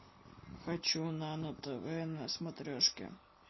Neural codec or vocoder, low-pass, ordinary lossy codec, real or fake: none; 7.2 kHz; MP3, 24 kbps; real